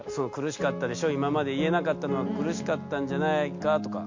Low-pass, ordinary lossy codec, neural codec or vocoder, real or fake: 7.2 kHz; MP3, 48 kbps; none; real